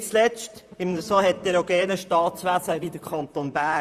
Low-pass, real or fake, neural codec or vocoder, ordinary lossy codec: 14.4 kHz; fake; vocoder, 44.1 kHz, 128 mel bands, Pupu-Vocoder; Opus, 64 kbps